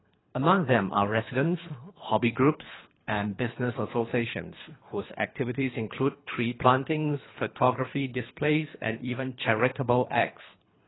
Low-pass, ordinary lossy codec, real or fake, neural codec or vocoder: 7.2 kHz; AAC, 16 kbps; fake; codec, 24 kHz, 3 kbps, HILCodec